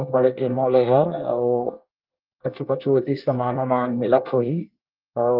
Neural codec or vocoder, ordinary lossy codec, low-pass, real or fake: codec, 24 kHz, 1 kbps, SNAC; Opus, 32 kbps; 5.4 kHz; fake